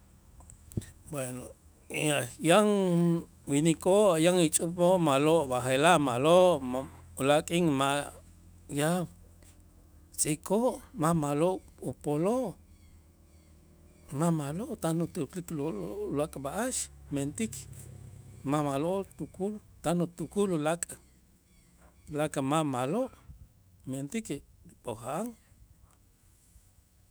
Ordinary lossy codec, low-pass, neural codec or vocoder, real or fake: none; none; autoencoder, 48 kHz, 128 numbers a frame, DAC-VAE, trained on Japanese speech; fake